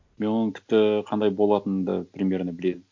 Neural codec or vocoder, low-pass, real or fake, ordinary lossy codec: none; 7.2 kHz; real; MP3, 48 kbps